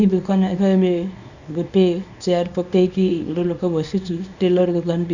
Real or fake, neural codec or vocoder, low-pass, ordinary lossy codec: fake; codec, 24 kHz, 0.9 kbps, WavTokenizer, small release; 7.2 kHz; none